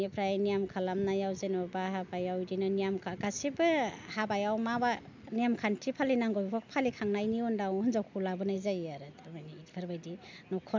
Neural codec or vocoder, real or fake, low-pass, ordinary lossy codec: none; real; 7.2 kHz; MP3, 64 kbps